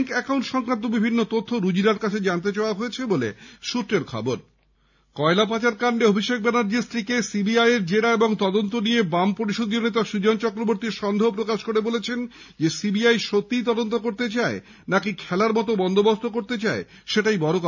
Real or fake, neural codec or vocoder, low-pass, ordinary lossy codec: real; none; 7.2 kHz; none